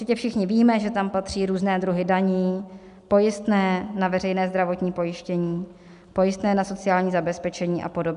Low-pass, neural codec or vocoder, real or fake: 10.8 kHz; none; real